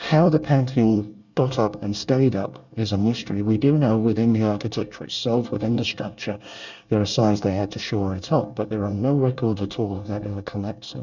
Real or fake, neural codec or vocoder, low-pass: fake; codec, 24 kHz, 1 kbps, SNAC; 7.2 kHz